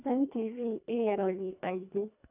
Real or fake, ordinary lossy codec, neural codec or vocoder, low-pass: fake; none; codec, 24 kHz, 1.5 kbps, HILCodec; 3.6 kHz